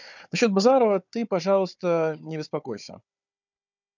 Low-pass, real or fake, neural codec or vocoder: 7.2 kHz; fake; codec, 16 kHz, 4 kbps, FunCodec, trained on Chinese and English, 50 frames a second